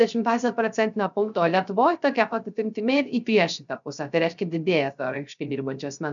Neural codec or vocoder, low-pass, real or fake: codec, 16 kHz, 0.3 kbps, FocalCodec; 7.2 kHz; fake